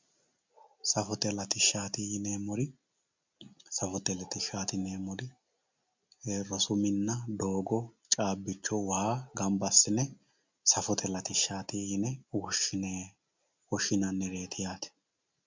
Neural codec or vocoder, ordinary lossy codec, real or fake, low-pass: none; MP3, 64 kbps; real; 7.2 kHz